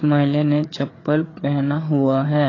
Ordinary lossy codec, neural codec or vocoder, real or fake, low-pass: AAC, 32 kbps; codec, 16 kHz, 4 kbps, FreqCodec, larger model; fake; 7.2 kHz